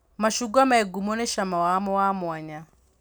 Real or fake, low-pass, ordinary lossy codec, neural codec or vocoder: real; none; none; none